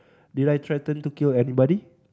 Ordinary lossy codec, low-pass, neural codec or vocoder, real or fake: none; none; none; real